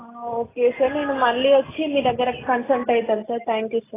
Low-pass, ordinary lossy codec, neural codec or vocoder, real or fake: 3.6 kHz; AAC, 16 kbps; none; real